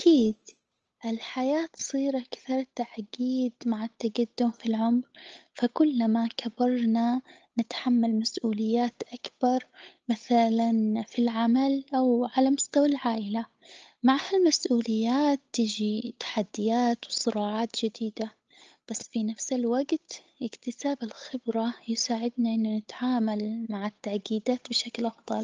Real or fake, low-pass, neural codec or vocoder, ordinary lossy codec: fake; 7.2 kHz; codec, 16 kHz, 8 kbps, FunCodec, trained on Chinese and English, 25 frames a second; Opus, 24 kbps